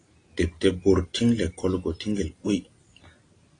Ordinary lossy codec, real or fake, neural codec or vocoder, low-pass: AAC, 32 kbps; real; none; 9.9 kHz